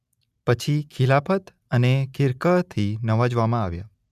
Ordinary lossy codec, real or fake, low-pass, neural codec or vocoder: none; real; 14.4 kHz; none